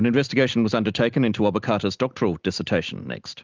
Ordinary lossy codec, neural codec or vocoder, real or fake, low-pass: Opus, 32 kbps; none; real; 7.2 kHz